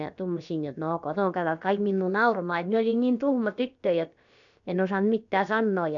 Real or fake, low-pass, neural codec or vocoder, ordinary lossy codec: fake; 7.2 kHz; codec, 16 kHz, about 1 kbps, DyCAST, with the encoder's durations; none